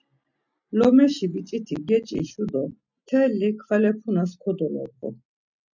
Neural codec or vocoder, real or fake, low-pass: none; real; 7.2 kHz